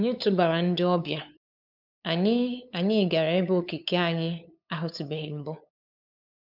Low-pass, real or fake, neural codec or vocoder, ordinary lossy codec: 5.4 kHz; fake; codec, 16 kHz, 8 kbps, FunCodec, trained on LibriTTS, 25 frames a second; none